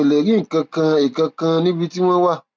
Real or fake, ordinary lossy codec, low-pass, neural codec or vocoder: real; none; none; none